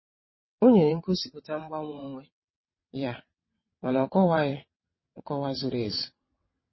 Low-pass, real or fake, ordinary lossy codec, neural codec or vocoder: 7.2 kHz; fake; MP3, 24 kbps; vocoder, 22.05 kHz, 80 mel bands, WaveNeXt